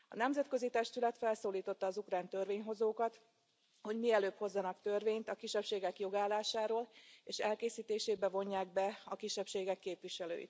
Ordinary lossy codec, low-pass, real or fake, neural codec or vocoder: none; none; real; none